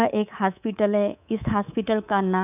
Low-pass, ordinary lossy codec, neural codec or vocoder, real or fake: 3.6 kHz; none; codec, 24 kHz, 3.1 kbps, DualCodec; fake